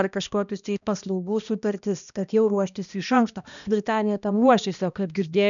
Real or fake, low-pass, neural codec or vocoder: fake; 7.2 kHz; codec, 16 kHz, 1 kbps, X-Codec, HuBERT features, trained on balanced general audio